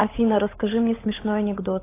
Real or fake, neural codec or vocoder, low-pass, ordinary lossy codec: fake; codec, 16 kHz, 16 kbps, FunCodec, trained on LibriTTS, 50 frames a second; 3.6 kHz; AAC, 16 kbps